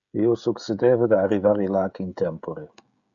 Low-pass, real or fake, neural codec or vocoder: 7.2 kHz; fake; codec, 16 kHz, 16 kbps, FreqCodec, smaller model